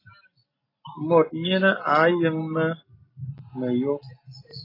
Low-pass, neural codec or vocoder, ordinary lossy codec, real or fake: 5.4 kHz; none; AAC, 24 kbps; real